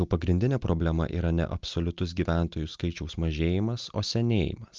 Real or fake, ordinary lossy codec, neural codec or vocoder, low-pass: real; Opus, 24 kbps; none; 7.2 kHz